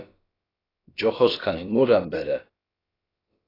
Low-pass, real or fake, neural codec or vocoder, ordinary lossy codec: 5.4 kHz; fake; codec, 16 kHz, about 1 kbps, DyCAST, with the encoder's durations; AAC, 24 kbps